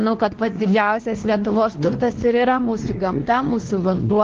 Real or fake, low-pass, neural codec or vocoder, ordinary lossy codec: fake; 7.2 kHz; codec, 16 kHz, 2 kbps, X-Codec, WavLM features, trained on Multilingual LibriSpeech; Opus, 16 kbps